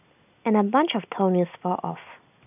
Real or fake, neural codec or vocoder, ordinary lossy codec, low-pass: real; none; none; 3.6 kHz